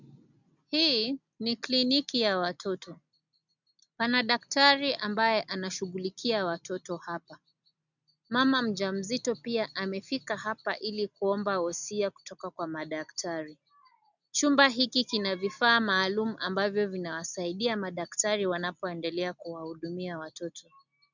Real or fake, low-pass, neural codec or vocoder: real; 7.2 kHz; none